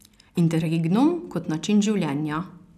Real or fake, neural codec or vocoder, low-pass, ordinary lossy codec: real; none; 14.4 kHz; none